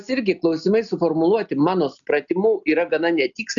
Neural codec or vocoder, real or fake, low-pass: none; real; 7.2 kHz